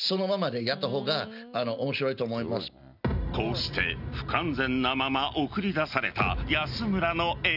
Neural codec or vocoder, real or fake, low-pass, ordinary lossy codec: none; real; 5.4 kHz; none